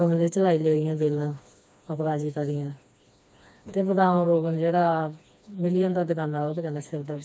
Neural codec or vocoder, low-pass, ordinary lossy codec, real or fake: codec, 16 kHz, 2 kbps, FreqCodec, smaller model; none; none; fake